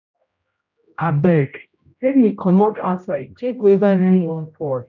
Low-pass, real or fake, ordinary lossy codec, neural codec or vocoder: 7.2 kHz; fake; none; codec, 16 kHz, 0.5 kbps, X-Codec, HuBERT features, trained on general audio